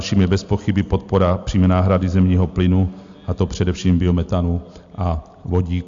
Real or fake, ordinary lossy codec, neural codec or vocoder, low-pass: real; AAC, 64 kbps; none; 7.2 kHz